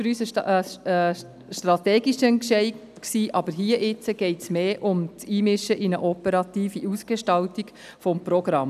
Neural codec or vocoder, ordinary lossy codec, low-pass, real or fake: none; none; 14.4 kHz; real